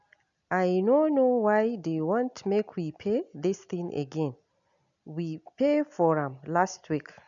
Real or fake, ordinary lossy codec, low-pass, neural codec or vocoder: real; none; 7.2 kHz; none